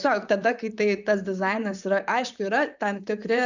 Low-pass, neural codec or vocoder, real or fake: 7.2 kHz; vocoder, 44.1 kHz, 128 mel bands, Pupu-Vocoder; fake